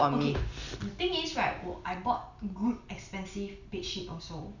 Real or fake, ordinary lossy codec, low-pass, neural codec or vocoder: real; none; 7.2 kHz; none